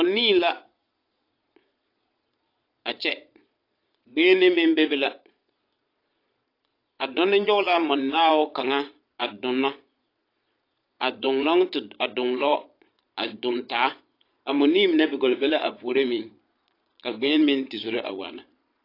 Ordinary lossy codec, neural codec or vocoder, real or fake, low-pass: AAC, 32 kbps; vocoder, 22.05 kHz, 80 mel bands, Vocos; fake; 5.4 kHz